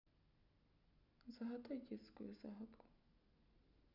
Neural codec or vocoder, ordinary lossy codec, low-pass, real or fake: none; none; 5.4 kHz; real